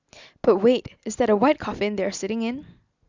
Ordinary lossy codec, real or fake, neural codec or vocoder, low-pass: Opus, 64 kbps; real; none; 7.2 kHz